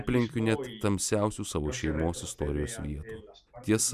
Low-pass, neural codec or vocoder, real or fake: 14.4 kHz; none; real